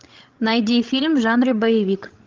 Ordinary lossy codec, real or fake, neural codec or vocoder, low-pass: Opus, 32 kbps; fake; codec, 16 kHz, 16 kbps, FreqCodec, larger model; 7.2 kHz